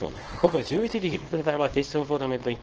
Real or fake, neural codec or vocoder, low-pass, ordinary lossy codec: fake; codec, 24 kHz, 0.9 kbps, WavTokenizer, small release; 7.2 kHz; Opus, 16 kbps